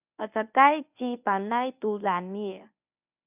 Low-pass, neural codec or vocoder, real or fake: 3.6 kHz; codec, 24 kHz, 0.9 kbps, WavTokenizer, medium speech release version 1; fake